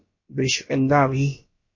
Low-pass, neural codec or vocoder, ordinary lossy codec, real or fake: 7.2 kHz; codec, 16 kHz, about 1 kbps, DyCAST, with the encoder's durations; MP3, 32 kbps; fake